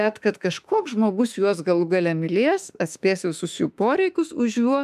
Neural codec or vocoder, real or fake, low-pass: autoencoder, 48 kHz, 32 numbers a frame, DAC-VAE, trained on Japanese speech; fake; 14.4 kHz